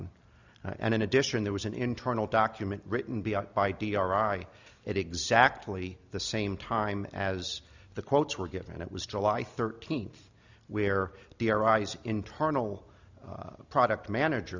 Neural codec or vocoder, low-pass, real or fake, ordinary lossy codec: none; 7.2 kHz; real; Opus, 64 kbps